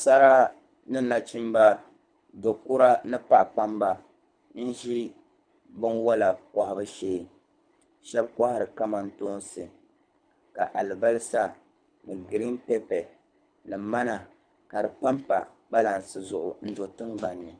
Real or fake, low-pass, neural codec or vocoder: fake; 9.9 kHz; codec, 24 kHz, 3 kbps, HILCodec